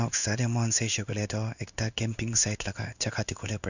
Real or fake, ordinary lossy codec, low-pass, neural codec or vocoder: fake; none; 7.2 kHz; codec, 16 kHz in and 24 kHz out, 1 kbps, XY-Tokenizer